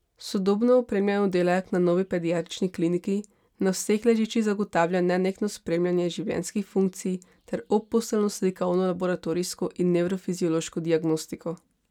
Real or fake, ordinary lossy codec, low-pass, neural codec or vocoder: real; none; 19.8 kHz; none